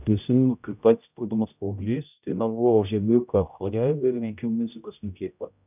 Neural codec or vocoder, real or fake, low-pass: codec, 16 kHz, 0.5 kbps, X-Codec, HuBERT features, trained on general audio; fake; 3.6 kHz